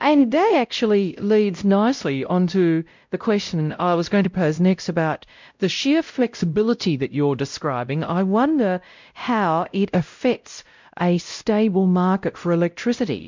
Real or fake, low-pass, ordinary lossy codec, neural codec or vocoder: fake; 7.2 kHz; MP3, 64 kbps; codec, 16 kHz, 0.5 kbps, X-Codec, WavLM features, trained on Multilingual LibriSpeech